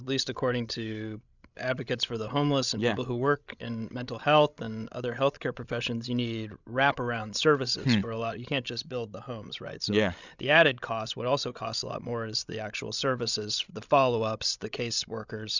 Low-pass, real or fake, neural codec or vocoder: 7.2 kHz; fake; codec, 16 kHz, 16 kbps, FreqCodec, larger model